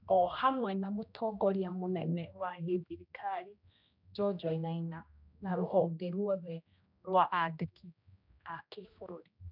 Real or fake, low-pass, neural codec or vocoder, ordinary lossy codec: fake; 5.4 kHz; codec, 16 kHz, 1 kbps, X-Codec, HuBERT features, trained on general audio; none